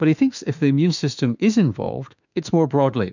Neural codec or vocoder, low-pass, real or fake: autoencoder, 48 kHz, 32 numbers a frame, DAC-VAE, trained on Japanese speech; 7.2 kHz; fake